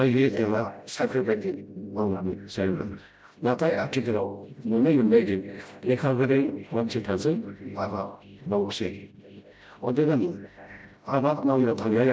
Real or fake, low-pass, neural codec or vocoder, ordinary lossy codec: fake; none; codec, 16 kHz, 0.5 kbps, FreqCodec, smaller model; none